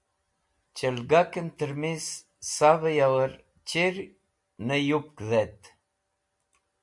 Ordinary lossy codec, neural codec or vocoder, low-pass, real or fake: MP3, 64 kbps; none; 10.8 kHz; real